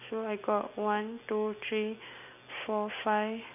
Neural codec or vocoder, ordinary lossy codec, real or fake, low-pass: none; none; real; 3.6 kHz